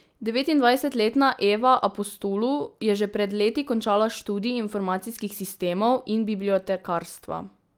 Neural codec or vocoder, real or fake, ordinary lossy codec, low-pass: none; real; Opus, 32 kbps; 19.8 kHz